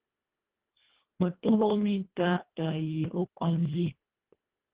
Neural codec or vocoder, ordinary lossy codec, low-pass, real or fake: codec, 24 kHz, 1.5 kbps, HILCodec; Opus, 16 kbps; 3.6 kHz; fake